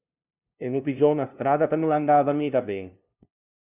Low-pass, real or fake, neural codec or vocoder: 3.6 kHz; fake; codec, 16 kHz, 0.5 kbps, FunCodec, trained on LibriTTS, 25 frames a second